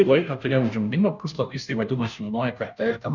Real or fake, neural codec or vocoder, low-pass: fake; codec, 16 kHz, 0.5 kbps, FunCodec, trained on Chinese and English, 25 frames a second; 7.2 kHz